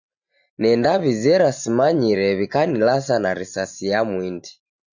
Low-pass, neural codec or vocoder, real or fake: 7.2 kHz; none; real